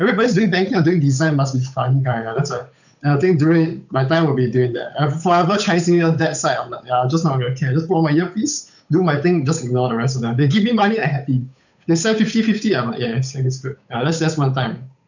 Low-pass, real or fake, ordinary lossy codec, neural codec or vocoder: 7.2 kHz; fake; none; codec, 16 kHz, 8 kbps, FunCodec, trained on Chinese and English, 25 frames a second